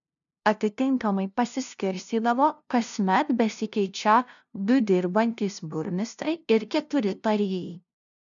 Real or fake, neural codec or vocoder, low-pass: fake; codec, 16 kHz, 0.5 kbps, FunCodec, trained on LibriTTS, 25 frames a second; 7.2 kHz